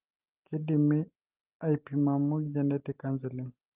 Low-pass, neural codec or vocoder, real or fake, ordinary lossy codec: 3.6 kHz; none; real; Opus, 32 kbps